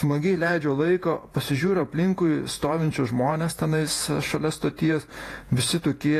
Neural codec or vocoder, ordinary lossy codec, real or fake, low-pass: vocoder, 48 kHz, 128 mel bands, Vocos; AAC, 48 kbps; fake; 14.4 kHz